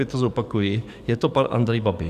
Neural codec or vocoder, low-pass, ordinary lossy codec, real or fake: codec, 44.1 kHz, 7.8 kbps, DAC; 14.4 kHz; Opus, 64 kbps; fake